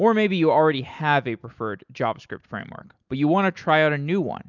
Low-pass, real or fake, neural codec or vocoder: 7.2 kHz; real; none